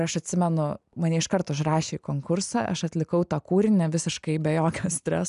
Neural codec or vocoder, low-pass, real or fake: none; 10.8 kHz; real